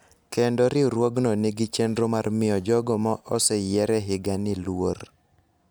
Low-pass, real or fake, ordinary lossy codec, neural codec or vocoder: none; real; none; none